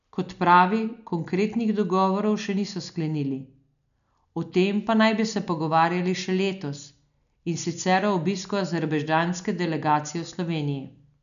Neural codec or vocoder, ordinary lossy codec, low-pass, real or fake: none; none; 7.2 kHz; real